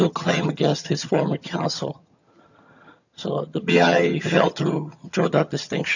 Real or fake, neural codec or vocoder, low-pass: fake; vocoder, 22.05 kHz, 80 mel bands, HiFi-GAN; 7.2 kHz